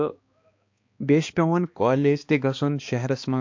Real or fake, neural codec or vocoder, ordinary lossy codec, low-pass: fake; codec, 16 kHz, 2 kbps, X-Codec, HuBERT features, trained on balanced general audio; MP3, 48 kbps; 7.2 kHz